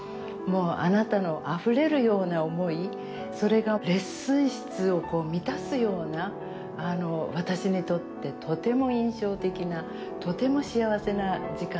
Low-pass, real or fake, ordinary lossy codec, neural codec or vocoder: none; real; none; none